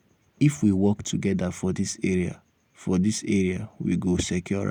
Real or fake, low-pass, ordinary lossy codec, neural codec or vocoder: real; 19.8 kHz; none; none